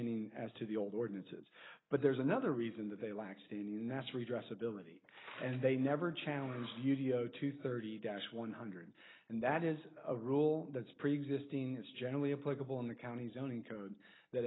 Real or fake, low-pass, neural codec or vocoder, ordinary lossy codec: real; 7.2 kHz; none; AAC, 16 kbps